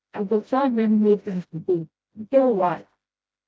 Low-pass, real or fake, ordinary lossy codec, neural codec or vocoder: none; fake; none; codec, 16 kHz, 0.5 kbps, FreqCodec, smaller model